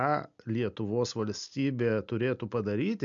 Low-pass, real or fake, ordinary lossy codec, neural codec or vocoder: 7.2 kHz; real; MP3, 64 kbps; none